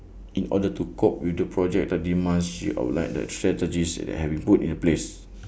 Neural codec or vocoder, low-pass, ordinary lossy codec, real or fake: none; none; none; real